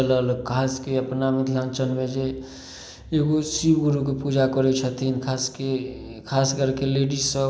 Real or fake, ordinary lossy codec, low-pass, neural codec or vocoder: real; none; none; none